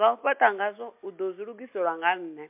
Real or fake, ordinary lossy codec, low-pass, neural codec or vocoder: real; MP3, 32 kbps; 3.6 kHz; none